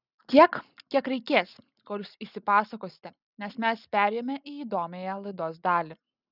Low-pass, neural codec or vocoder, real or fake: 5.4 kHz; none; real